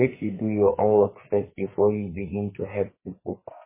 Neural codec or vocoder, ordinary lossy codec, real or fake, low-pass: codec, 44.1 kHz, 2.6 kbps, SNAC; MP3, 16 kbps; fake; 3.6 kHz